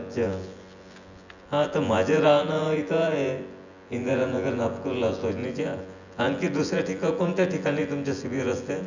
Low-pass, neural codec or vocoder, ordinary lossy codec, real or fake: 7.2 kHz; vocoder, 24 kHz, 100 mel bands, Vocos; none; fake